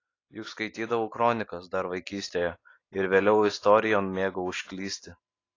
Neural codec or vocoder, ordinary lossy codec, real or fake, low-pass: none; AAC, 32 kbps; real; 7.2 kHz